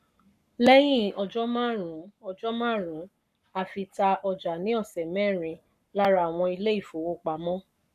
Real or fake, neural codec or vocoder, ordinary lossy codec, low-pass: fake; codec, 44.1 kHz, 7.8 kbps, Pupu-Codec; none; 14.4 kHz